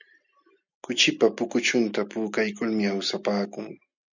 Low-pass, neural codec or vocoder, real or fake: 7.2 kHz; none; real